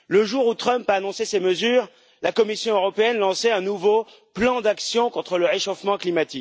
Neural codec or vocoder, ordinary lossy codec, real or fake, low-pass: none; none; real; none